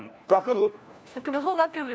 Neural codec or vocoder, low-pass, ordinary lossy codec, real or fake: codec, 16 kHz, 1 kbps, FunCodec, trained on LibriTTS, 50 frames a second; none; none; fake